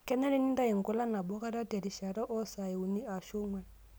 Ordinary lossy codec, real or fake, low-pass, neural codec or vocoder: none; real; none; none